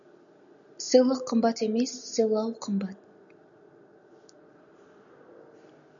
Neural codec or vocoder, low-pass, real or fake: none; 7.2 kHz; real